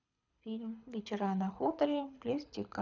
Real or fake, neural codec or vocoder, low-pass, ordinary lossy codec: fake; codec, 24 kHz, 6 kbps, HILCodec; 7.2 kHz; none